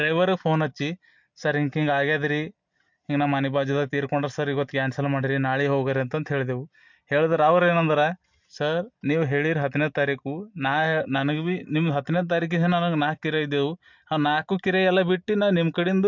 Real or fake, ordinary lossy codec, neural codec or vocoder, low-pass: real; MP3, 64 kbps; none; 7.2 kHz